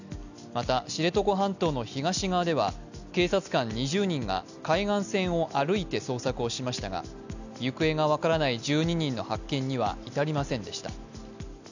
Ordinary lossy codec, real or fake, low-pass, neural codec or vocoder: none; real; 7.2 kHz; none